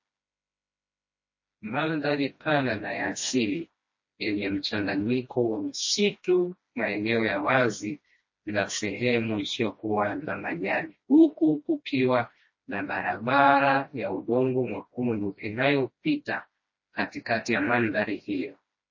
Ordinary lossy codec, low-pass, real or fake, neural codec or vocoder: MP3, 32 kbps; 7.2 kHz; fake; codec, 16 kHz, 1 kbps, FreqCodec, smaller model